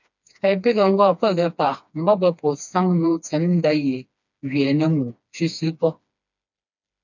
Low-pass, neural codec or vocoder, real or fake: 7.2 kHz; codec, 16 kHz, 2 kbps, FreqCodec, smaller model; fake